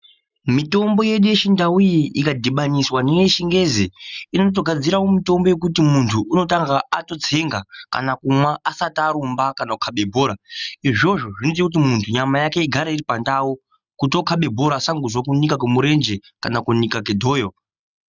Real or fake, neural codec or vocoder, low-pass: real; none; 7.2 kHz